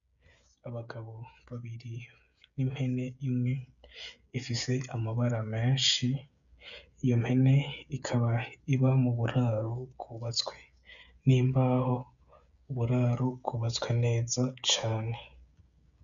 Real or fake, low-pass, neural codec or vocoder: fake; 7.2 kHz; codec, 16 kHz, 16 kbps, FreqCodec, smaller model